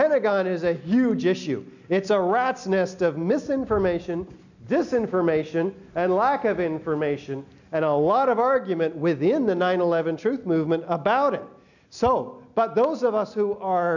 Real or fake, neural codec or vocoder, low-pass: real; none; 7.2 kHz